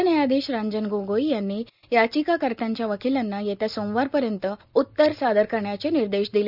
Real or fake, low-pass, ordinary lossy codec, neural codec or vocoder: real; 5.4 kHz; none; none